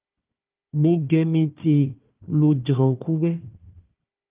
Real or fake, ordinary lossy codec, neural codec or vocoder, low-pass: fake; Opus, 24 kbps; codec, 16 kHz, 1 kbps, FunCodec, trained on Chinese and English, 50 frames a second; 3.6 kHz